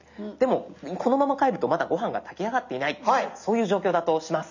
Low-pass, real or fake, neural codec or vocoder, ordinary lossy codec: 7.2 kHz; real; none; none